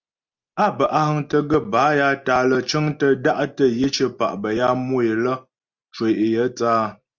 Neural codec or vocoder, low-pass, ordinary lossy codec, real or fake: none; 7.2 kHz; Opus, 32 kbps; real